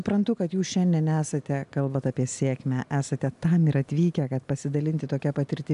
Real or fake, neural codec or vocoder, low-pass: real; none; 10.8 kHz